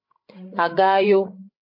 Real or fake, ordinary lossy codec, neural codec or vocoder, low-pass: fake; MP3, 32 kbps; codec, 16 kHz, 16 kbps, FreqCodec, larger model; 5.4 kHz